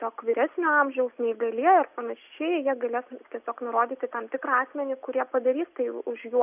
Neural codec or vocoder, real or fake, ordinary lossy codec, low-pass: none; real; AAC, 32 kbps; 3.6 kHz